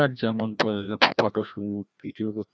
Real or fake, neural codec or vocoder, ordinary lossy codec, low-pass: fake; codec, 16 kHz, 1 kbps, FreqCodec, larger model; none; none